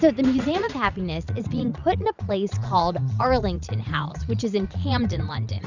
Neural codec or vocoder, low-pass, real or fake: vocoder, 44.1 kHz, 80 mel bands, Vocos; 7.2 kHz; fake